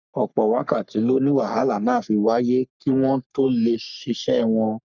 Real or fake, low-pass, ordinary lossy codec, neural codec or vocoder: fake; 7.2 kHz; none; codec, 44.1 kHz, 3.4 kbps, Pupu-Codec